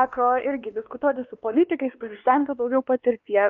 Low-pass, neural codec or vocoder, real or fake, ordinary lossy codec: 7.2 kHz; codec, 16 kHz, 2 kbps, X-Codec, WavLM features, trained on Multilingual LibriSpeech; fake; Opus, 32 kbps